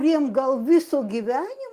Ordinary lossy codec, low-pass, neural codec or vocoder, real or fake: Opus, 24 kbps; 14.4 kHz; none; real